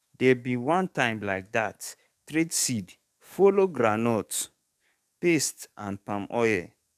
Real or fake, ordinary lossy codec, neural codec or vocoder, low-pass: fake; none; codec, 44.1 kHz, 7.8 kbps, DAC; 14.4 kHz